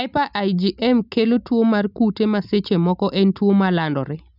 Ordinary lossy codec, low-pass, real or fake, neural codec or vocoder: none; 5.4 kHz; real; none